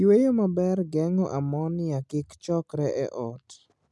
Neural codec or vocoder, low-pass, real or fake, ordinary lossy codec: none; none; real; none